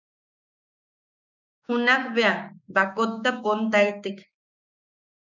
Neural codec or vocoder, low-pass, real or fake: codec, 16 kHz, 6 kbps, DAC; 7.2 kHz; fake